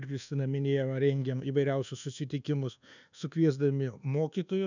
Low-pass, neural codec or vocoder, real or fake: 7.2 kHz; codec, 24 kHz, 1.2 kbps, DualCodec; fake